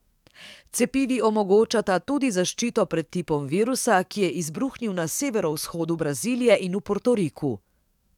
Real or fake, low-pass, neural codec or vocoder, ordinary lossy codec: fake; 19.8 kHz; codec, 44.1 kHz, 7.8 kbps, DAC; none